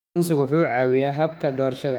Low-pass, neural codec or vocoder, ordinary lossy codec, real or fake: 19.8 kHz; autoencoder, 48 kHz, 32 numbers a frame, DAC-VAE, trained on Japanese speech; none; fake